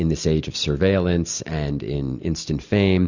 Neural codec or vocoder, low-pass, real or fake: none; 7.2 kHz; real